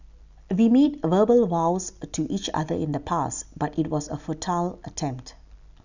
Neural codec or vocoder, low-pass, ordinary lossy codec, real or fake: none; 7.2 kHz; none; real